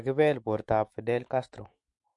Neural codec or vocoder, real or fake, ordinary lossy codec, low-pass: autoencoder, 48 kHz, 128 numbers a frame, DAC-VAE, trained on Japanese speech; fake; MP3, 48 kbps; 10.8 kHz